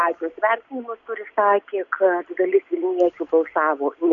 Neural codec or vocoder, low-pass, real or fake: none; 7.2 kHz; real